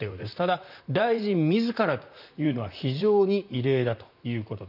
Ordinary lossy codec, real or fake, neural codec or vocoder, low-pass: none; fake; vocoder, 44.1 kHz, 128 mel bands, Pupu-Vocoder; 5.4 kHz